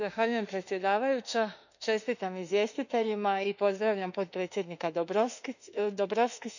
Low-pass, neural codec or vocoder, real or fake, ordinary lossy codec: 7.2 kHz; autoencoder, 48 kHz, 32 numbers a frame, DAC-VAE, trained on Japanese speech; fake; none